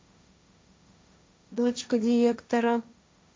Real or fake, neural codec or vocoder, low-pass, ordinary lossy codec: fake; codec, 16 kHz, 1.1 kbps, Voila-Tokenizer; none; none